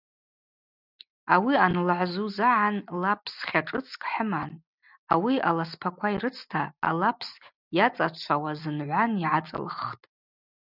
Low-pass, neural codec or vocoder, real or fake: 5.4 kHz; none; real